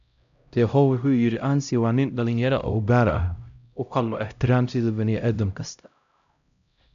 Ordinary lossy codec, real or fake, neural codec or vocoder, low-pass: AAC, 96 kbps; fake; codec, 16 kHz, 0.5 kbps, X-Codec, HuBERT features, trained on LibriSpeech; 7.2 kHz